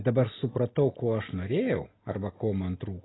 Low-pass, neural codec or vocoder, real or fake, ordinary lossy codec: 7.2 kHz; none; real; AAC, 16 kbps